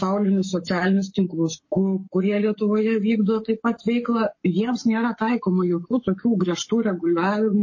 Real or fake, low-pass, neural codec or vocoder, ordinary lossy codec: fake; 7.2 kHz; codec, 44.1 kHz, 7.8 kbps, Pupu-Codec; MP3, 32 kbps